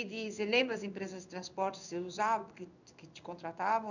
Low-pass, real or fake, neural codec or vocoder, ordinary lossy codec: 7.2 kHz; fake; codec, 16 kHz in and 24 kHz out, 1 kbps, XY-Tokenizer; none